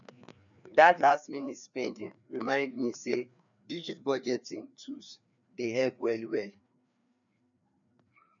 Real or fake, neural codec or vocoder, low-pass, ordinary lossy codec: fake; codec, 16 kHz, 2 kbps, FreqCodec, larger model; 7.2 kHz; none